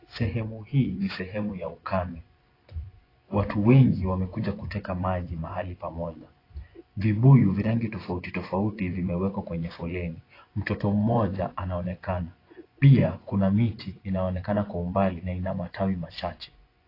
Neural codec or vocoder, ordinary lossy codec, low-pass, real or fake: vocoder, 24 kHz, 100 mel bands, Vocos; AAC, 24 kbps; 5.4 kHz; fake